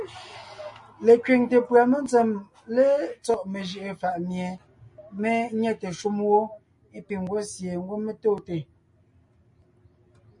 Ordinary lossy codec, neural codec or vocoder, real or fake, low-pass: MP3, 48 kbps; none; real; 10.8 kHz